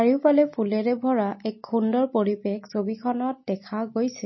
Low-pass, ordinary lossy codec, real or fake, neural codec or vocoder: 7.2 kHz; MP3, 24 kbps; real; none